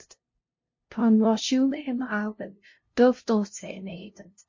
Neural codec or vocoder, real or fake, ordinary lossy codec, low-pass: codec, 16 kHz, 0.5 kbps, FunCodec, trained on LibriTTS, 25 frames a second; fake; MP3, 32 kbps; 7.2 kHz